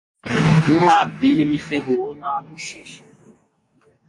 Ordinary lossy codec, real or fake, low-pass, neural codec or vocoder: AAC, 64 kbps; fake; 10.8 kHz; codec, 44.1 kHz, 2.6 kbps, DAC